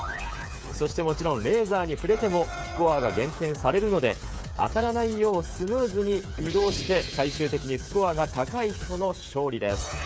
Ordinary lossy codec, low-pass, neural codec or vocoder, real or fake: none; none; codec, 16 kHz, 8 kbps, FreqCodec, smaller model; fake